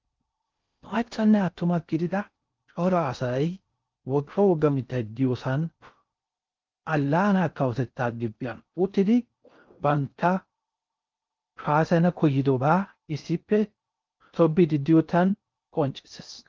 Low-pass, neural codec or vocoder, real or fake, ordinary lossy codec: 7.2 kHz; codec, 16 kHz in and 24 kHz out, 0.6 kbps, FocalCodec, streaming, 4096 codes; fake; Opus, 24 kbps